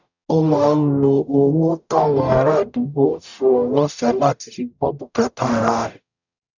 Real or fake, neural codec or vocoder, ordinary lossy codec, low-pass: fake; codec, 44.1 kHz, 0.9 kbps, DAC; none; 7.2 kHz